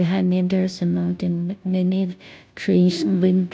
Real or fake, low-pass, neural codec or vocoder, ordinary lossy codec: fake; none; codec, 16 kHz, 0.5 kbps, FunCodec, trained on Chinese and English, 25 frames a second; none